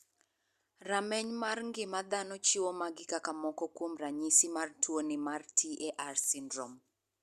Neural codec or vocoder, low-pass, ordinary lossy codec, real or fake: none; 14.4 kHz; Opus, 64 kbps; real